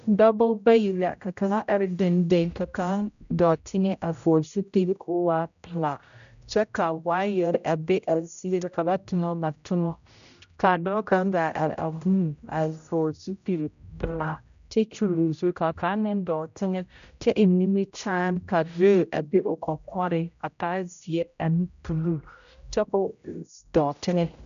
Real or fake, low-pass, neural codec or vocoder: fake; 7.2 kHz; codec, 16 kHz, 0.5 kbps, X-Codec, HuBERT features, trained on general audio